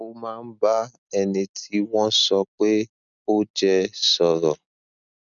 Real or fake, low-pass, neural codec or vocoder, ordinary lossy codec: real; 7.2 kHz; none; none